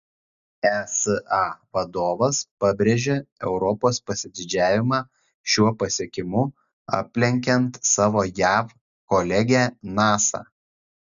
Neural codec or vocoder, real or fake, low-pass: none; real; 7.2 kHz